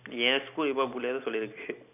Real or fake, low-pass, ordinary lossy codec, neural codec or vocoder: fake; 3.6 kHz; none; vocoder, 44.1 kHz, 128 mel bands every 256 samples, BigVGAN v2